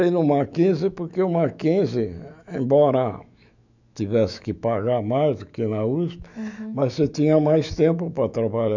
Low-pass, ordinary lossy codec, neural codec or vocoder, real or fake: 7.2 kHz; none; autoencoder, 48 kHz, 128 numbers a frame, DAC-VAE, trained on Japanese speech; fake